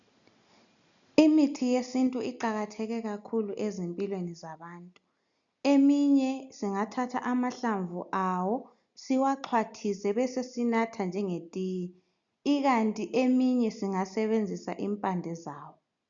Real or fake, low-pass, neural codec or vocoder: real; 7.2 kHz; none